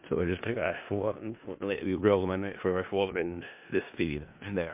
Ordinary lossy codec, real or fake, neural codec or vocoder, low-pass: MP3, 32 kbps; fake; codec, 16 kHz in and 24 kHz out, 0.4 kbps, LongCat-Audio-Codec, four codebook decoder; 3.6 kHz